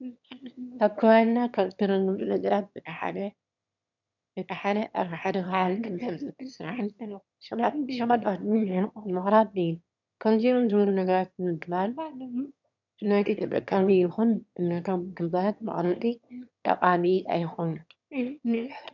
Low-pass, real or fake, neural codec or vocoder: 7.2 kHz; fake; autoencoder, 22.05 kHz, a latent of 192 numbers a frame, VITS, trained on one speaker